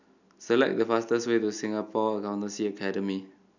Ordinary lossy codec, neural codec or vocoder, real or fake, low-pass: none; none; real; 7.2 kHz